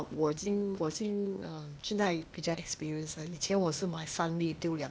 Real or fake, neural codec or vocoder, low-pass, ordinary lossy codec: fake; codec, 16 kHz, 0.8 kbps, ZipCodec; none; none